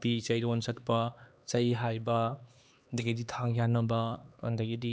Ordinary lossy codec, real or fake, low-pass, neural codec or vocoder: none; fake; none; codec, 16 kHz, 2 kbps, X-Codec, HuBERT features, trained on LibriSpeech